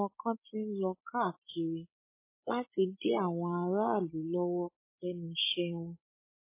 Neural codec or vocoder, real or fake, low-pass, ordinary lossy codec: codec, 16 kHz, 16 kbps, FreqCodec, larger model; fake; 3.6 kHz; MP3, 16 kbps